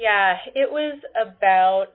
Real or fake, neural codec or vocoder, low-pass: real; none; 5.4 kHz